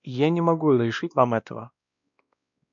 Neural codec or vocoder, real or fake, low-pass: codec, 16 kHz, 1 kbps, X-Codec, WavLM features, trained on Multilingual LibriSpeech; fake; 7.2 kHz